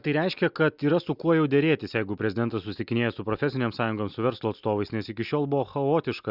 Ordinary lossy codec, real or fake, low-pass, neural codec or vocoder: Opus, 64 kbps; real; 5.4 kHz; none